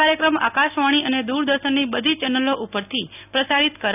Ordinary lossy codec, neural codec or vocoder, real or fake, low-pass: none; none; real; 3.6 kHz